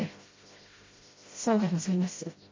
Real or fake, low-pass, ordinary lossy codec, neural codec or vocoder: fake; 7.2 kHz; MP3, 32 kbps; codec, 16 kHz, 0.5 kbps, FreqCodec, smaller model